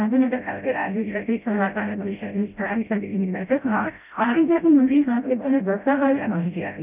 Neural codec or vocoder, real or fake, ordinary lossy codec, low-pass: codec, 16 kHz, 0.5 kbps, FreqCodec, smaller model; fake; none; 3.6 kHz